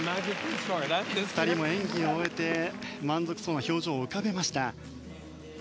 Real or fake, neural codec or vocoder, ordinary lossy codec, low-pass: real; none; none; none